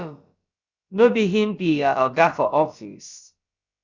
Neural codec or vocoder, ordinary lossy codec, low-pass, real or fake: codec, 16 kHz, about 1 kbps, DyCAST, with the encoder's durations; Opus, 64 kbps; 7.2 kHz; fake